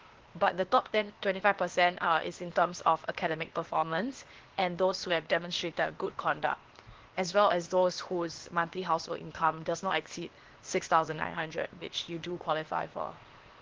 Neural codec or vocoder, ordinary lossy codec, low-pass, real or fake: codec, 16 kHz, 0.8 kbps, ZipCodec; Opus, 16 kbps; 7.2 kHz; fake